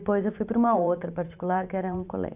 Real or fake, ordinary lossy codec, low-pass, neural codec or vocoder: fake; none; 3.6 kHz; vocoder, 44.1 kHz, 128 mel bands every 512 samples, BigVGAN v2